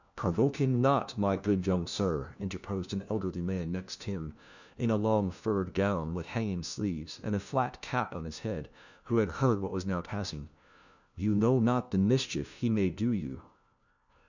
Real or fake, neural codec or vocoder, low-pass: fake; codec, 16 kHz, 1 kbps, FunCodec, trained on LibriTTS, 50 frames a second; 7.2 kHz